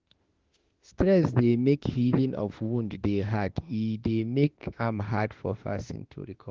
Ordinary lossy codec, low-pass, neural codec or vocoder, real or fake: Opus, 16 kbps; 7.2 kHz; autoencoder, 48 kHz, 32 numbers a frame, DAC-VAE, trained on Japanese speech; fake